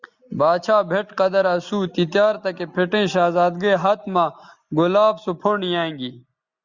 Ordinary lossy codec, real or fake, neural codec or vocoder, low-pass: Opus, 64 kbps; real; none; 7.2 kHz